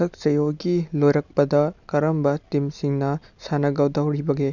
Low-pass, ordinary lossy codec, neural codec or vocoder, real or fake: 7.2 kHz; none; none; real